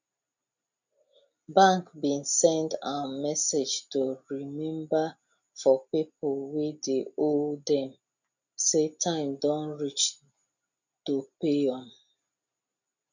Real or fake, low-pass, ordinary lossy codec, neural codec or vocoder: real; 7.2 kHz; none; none